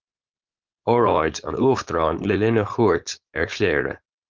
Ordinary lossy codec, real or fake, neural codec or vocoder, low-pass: Opus, 32 kbps; fake; codec, 16 kHz, 4.8 kbps, FACodec; 7.2 kHz